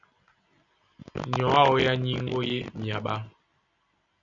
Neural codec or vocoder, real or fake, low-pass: none; real; 7.2 kHz